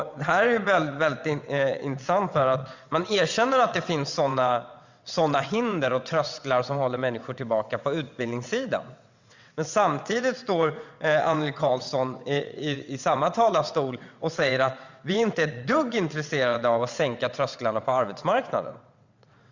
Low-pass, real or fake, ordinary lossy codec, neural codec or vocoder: 7.2 kHz; fake; Opus, 64 kbps; vocoder, 22.05 kHz, 80 mel bands, WaveNeXt